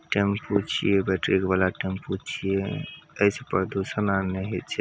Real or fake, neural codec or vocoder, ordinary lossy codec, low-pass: real; none; none; none